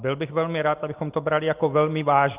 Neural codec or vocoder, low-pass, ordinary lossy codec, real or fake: none; 3.6 kHz; Opus, 24 kbps; real